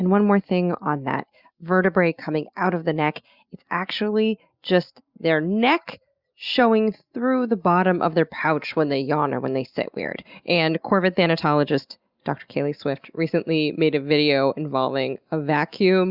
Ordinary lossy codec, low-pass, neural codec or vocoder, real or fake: Opus, 64 kbps; 5.4 kHz; none; real